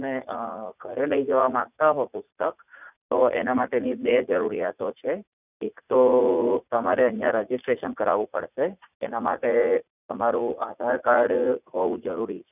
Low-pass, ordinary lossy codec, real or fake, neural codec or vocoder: 3.6 kHz; none; fake; vocoder, 44.1 kHz, 80 mel bands, Vocos